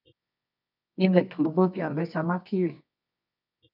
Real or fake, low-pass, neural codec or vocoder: fake; 5.4 kHz; codec, 24 kHz, 0.9 kbps, WavTokenizer, medium music audio release